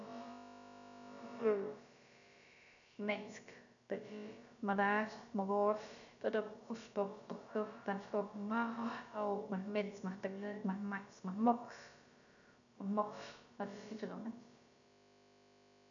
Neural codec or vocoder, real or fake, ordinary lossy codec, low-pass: codec, 16 kHz, about 1 kbps, DyCAST, with the encoder's durations; fake; none; 7.2 kHz